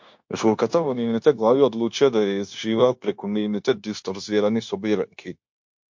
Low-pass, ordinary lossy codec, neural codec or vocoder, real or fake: 7.2 kHz; MP3, 48 kbps; codec, 16 kHz, 0.9 kbps, LongCat-Audio-Codec; fake